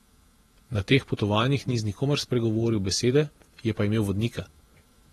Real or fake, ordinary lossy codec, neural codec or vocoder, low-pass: fake; AAC, 32 kbps; vocoder, 48 kHz, 128 mel bands, Vocos; 19.8 kHz